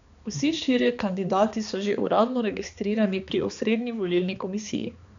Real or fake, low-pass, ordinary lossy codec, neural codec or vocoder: fake; 7.2 kHz; MP3, 96 kbps; codec, 16 kHz, 2 kbps, X-Codec, HuBERT features, trained on balanced general audio